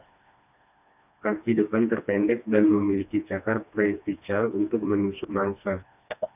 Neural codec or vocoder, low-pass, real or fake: codec, 16 kHz, 2 kbps, FreqCodec, smaller model; 3.6 kHz; fake